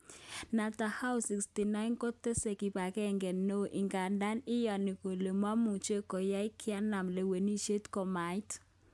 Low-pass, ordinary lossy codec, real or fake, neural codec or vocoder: none; none; real; none